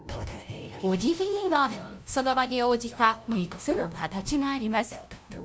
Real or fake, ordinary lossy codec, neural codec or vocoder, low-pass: fake; none; codec, 16 kHz, 0.5 kbps, FunCodec, trained on LibriTTS, 25 frames a second; none